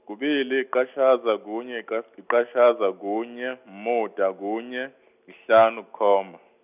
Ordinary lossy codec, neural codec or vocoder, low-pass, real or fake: none; none; 3.6 kHz; real